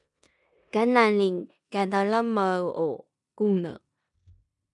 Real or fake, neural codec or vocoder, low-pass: fake; codec, 16 kHz in and 24 kHz out, 0.9 kbps, LongCat-Audio-Codec, four codebook decoder; 10.8 kHz